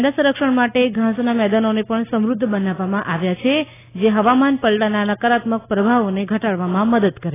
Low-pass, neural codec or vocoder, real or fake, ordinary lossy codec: 3.6 kHz; none; real; AAC, 16 kbps